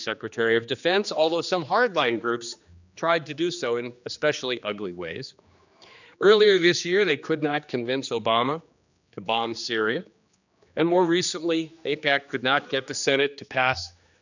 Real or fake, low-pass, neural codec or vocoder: fake; 7.2 kHz; codec, 16 kHz, 2 kbps, X-Codec, HuBERT features, trained on general audio